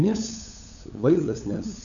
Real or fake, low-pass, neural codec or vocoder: fake; 7.2 kHz; codec, 16 kHz, 8 kbps, FunCodec, trained on LibriTTS, 25 frames a second